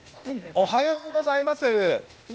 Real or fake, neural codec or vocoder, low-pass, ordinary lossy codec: fake; codec, 16 kHz, 0.8 kbps, ZipCodec; none; none